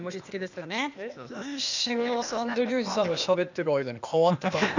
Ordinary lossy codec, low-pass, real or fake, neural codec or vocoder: none; 7.2 kHz; fake; codec, 16 kHz, 0.8 kbps, ZipCodec